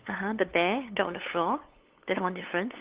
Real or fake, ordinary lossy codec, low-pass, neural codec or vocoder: fake; Opus, 16 kbps; 3.6 kHz; codec, 16 kHz, 8 kbps, FunCodec, trained on LibriTTS, 25 frames a second